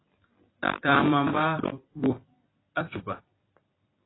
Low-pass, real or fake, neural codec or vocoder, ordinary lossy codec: 7.2 kHz; fake; vocoder, 44.1 kHz, 128 mel bands every 256 samples, BigVGAN v2; AAC, 16 kbps